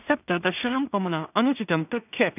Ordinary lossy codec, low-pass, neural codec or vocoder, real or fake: none; 3.6 kHz; codec, 16 kHz in and 24 kHz out, 0.4 kbps, LongCat-Audio-Codec, two codebook decoder; fake